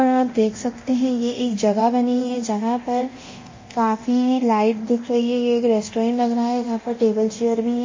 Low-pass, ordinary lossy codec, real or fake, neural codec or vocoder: 7.2 kHz; MP3, 32 kbps; fake; codec, 24 kHz, 0.9 kbps, DualCodec